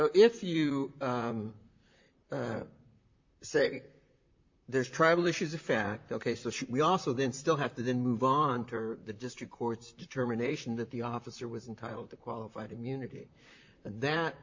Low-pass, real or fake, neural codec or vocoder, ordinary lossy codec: 7.2 kHz; fake; vocoder, 44.1 kHz, 128 mel bands, Pupu-Vocoder; MP3, 48 kbps